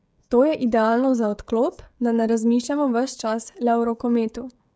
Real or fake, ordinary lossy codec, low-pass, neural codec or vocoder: fake; none; none; codec, 16 kHz, 16 kbps, FreqCodec, smaller model